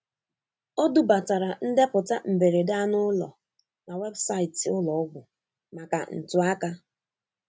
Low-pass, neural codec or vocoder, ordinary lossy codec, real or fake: none; none; none; real